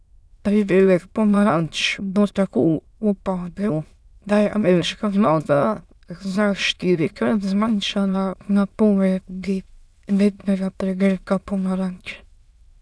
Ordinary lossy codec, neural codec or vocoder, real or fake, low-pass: none; autoencoder, 22.05 kHz, a latent of 192 numbers a frame, VITS, trained on many speakers; fake; none